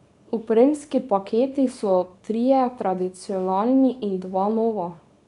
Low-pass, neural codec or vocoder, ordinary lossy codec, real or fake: 10.8 kHz; codec, 24 kHz, 0.9 kbps, WavTokenizer, small release; MP3, 96 kbps; fake